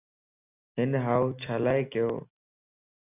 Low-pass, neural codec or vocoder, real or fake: 3.6 kHz; none; real